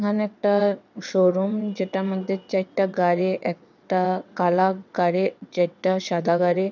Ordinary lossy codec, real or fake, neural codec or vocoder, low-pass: none; fake; vocoder, 22.05 kHz, 80 mel bands, WaveNeXt; 7.2 kHz